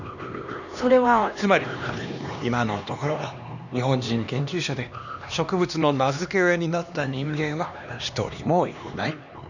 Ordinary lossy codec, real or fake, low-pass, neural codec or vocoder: none; fake; 7.2 kHz; codec, 16 kHz, 2 kbps, X-Codec, HuBERT features, trained on LibriSpeech